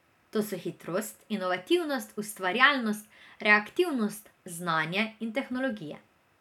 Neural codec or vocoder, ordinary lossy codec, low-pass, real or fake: none; none; 19.8 kHz; real